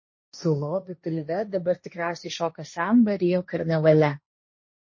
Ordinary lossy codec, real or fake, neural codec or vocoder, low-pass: MP3, 32 kbps; fake; codec, 16 kHz, 1.1 kbps, Voila-Tokenizer; 7.2 kHz